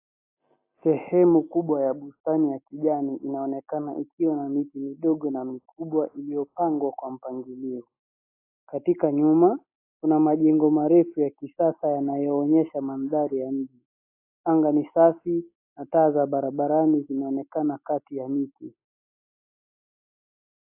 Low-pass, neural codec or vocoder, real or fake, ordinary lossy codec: 3.6 kHz; none; real; AAC, 24 kbps